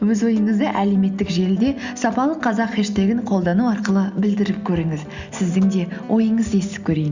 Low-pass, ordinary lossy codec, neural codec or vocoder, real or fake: 7.2 kHz; Opus, 64 kbps; none; real